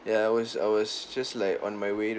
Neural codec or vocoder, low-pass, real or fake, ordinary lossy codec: none; none; real; none